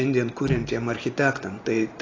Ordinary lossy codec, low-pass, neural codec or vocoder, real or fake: AAC, 32 kbps; 7.2 kHz; none; real